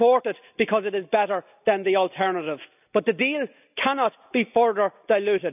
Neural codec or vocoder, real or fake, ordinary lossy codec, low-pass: none; real; none; 3.6 kHz